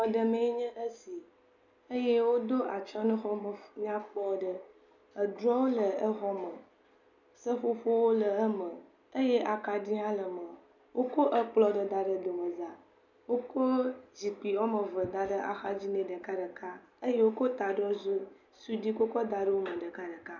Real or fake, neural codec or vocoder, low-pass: real; none; 7.2 kHz